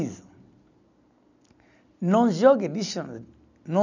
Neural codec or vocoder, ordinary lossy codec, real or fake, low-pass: none; none; real; 7.2 kHz